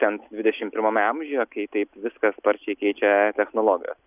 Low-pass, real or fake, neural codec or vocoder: 3.6 kHz; real; none